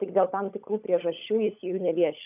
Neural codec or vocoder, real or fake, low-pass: codec, 16 kHz, 16 kbps, FunCodec, trained on LibriTTS, 50 frames a second; fake; 3.6 kHz